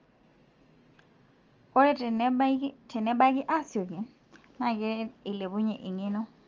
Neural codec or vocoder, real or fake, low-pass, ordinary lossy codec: none; real; 7.2 kHz; Opus, 24 kbps